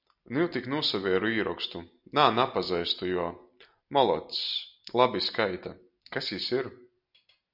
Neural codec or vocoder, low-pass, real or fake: none; 5.4 kHz; real